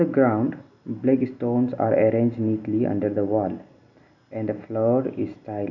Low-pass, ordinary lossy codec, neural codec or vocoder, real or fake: 7.2 kHz; none; none; real